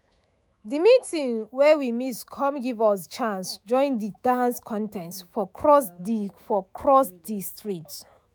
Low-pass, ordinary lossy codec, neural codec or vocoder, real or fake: none; none; autoencoder, 48 kHz, 128 numbers a frame, DAC-VAE, trained on Japanese speech; fake